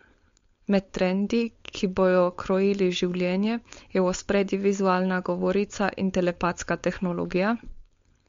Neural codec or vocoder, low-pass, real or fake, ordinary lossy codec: codec, 16 kHz, 4.8 kbps, FACodec; 7.2 kHz; fake; MP3, 48 kbps